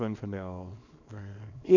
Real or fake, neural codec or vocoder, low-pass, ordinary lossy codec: fake; codec, 24 kHz, 0.9 kbps, WavTokenizer, small release; 7.2 kHz; none